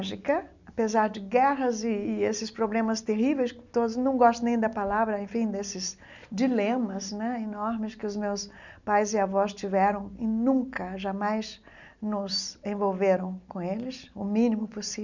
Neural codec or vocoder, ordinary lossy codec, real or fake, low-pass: none; none; real; 7.2 kHz